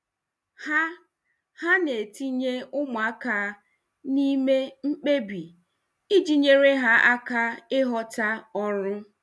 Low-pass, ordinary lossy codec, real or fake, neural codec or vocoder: none; none; real; none